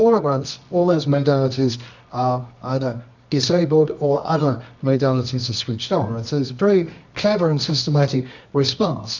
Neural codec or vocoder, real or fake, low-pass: codec, 24 kHz, 0.9 kbps, WavTokenizer, medium music audio release; fake; 7.2 kHz